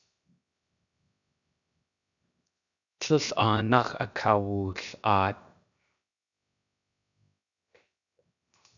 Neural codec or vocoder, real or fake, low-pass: codec, 16 kHz, 0.7 kbps, FocalCodec; fake; 7.2 kHz